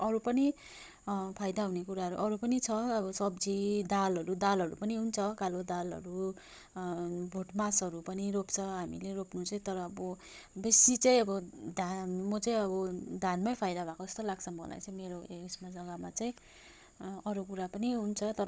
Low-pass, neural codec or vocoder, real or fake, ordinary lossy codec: none; codec, 16 kHz, 16 kbps, FunCodec, trained on Chinese and English, 50 frames a second; fake; none